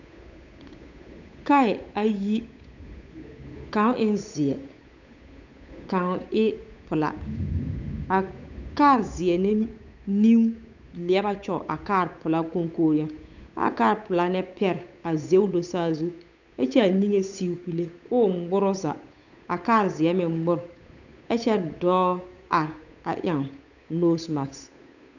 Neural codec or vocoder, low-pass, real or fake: codec, 16 kHz, 8 kbps, FunCodec, trained on Chinese and English, 25 frames a second; 7.2 kHz; fake